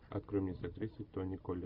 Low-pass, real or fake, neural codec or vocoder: 5.4 kHz; real; none